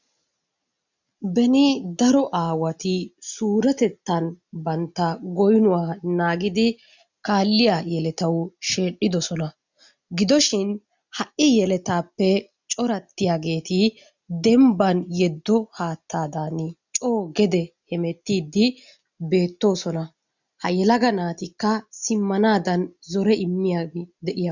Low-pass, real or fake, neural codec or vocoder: 7.2 kHz; real; none